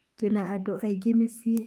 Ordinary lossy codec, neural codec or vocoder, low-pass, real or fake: Opus, 32 kbps; autoencoder, 48 kHz, 32 numbers a frame, DAC-VAE, trained on Japanese speech; 19.8 kHz; fake